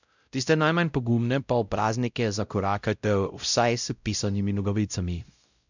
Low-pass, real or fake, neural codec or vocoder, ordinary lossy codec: 7.2 kHz; fake; codec, 16 kHz, 0.5 kbps, X-Codec, WavLM features, trained on Multilingual LibriSpeech; none